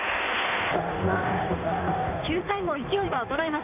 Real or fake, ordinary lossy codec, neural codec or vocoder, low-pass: fake; none; codec, 16 kHz in and 24 kHz out, 1.1 kbps, FireRedTTS-2 codec; 3.6 kHz